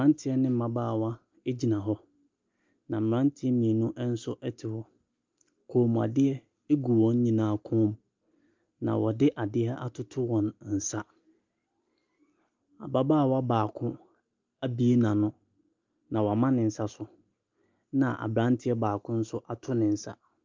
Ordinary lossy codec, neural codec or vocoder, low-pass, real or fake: Opus, 24 kbps; none; 7.2 kHz; real